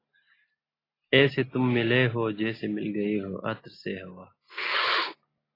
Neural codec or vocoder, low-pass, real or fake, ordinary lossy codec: none; 5.4 kHz; real; AAC, 24 kbps